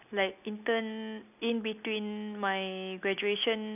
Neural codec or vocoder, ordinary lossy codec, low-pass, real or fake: none; none; 3.6 kHz; real